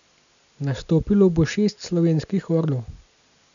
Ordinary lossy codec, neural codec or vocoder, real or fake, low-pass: none; none; real; 7.2 kHz